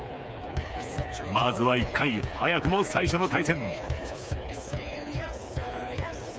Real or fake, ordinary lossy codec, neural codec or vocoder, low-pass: fake; none; codec, 16 kHz, 4 kbps, FreqCodec, smaller model; none